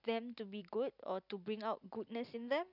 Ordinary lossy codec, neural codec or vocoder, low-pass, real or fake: none; none; 5.4 kHz; real